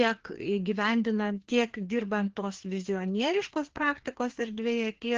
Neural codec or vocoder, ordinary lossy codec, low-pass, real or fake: codec, 16 kHz, 2 kbps, FreqCodec, larger model; Opus, 16 kbps; 7.2 kHz; fake